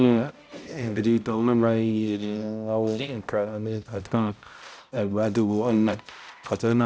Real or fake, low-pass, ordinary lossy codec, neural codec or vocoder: fake; none; none; codec, 16 kHz, 0.5 kbps, X-Codec, HuBERT features, trained on balanced general audio